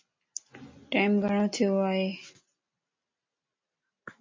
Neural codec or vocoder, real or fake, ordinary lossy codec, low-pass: none; real; MP3, 32 kbps; 7.2 kHz